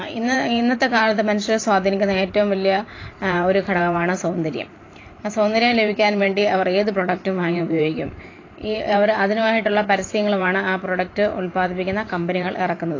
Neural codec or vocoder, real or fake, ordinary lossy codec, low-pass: vocoder, 44.1 kHz, 128 mel bands every 512 samples, BigVGAN v2; fake; AAC, 32 kbps; 7.2 kHz